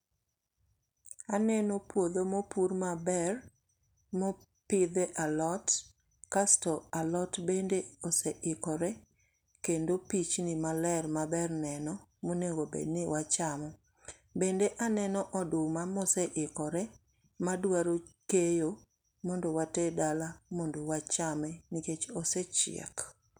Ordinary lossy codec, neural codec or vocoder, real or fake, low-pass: none; none; real; 19.8 kHz